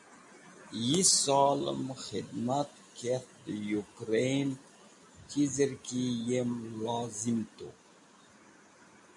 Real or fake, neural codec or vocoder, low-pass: real; none; 10.8 kHz